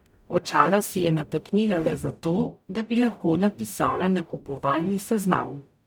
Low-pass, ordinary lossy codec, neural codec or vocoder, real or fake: none; none; codec, 44.1 kHz, 0.9 kbps, DAC; fake